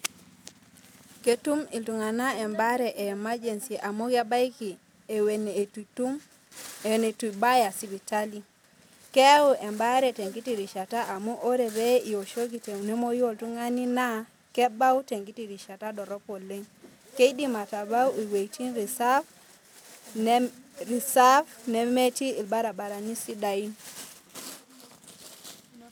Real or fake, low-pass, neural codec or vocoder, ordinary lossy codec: real; none; none; none